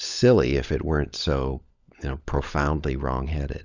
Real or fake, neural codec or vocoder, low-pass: fake; codec, 16 kHz, 16 kbps, FunCodec, trained on LibriTTS, 50 frames a second; 7.2 kHz